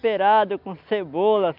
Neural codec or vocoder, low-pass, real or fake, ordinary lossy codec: none; 5.4 kHz; real; none